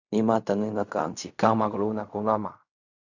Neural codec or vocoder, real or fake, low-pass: codec, 16 kHz in and 24 kHz out, 0.4 kbps, LongCat-Audio-Codec, fine tuned four codebook decoder; fake; 7.2 kHz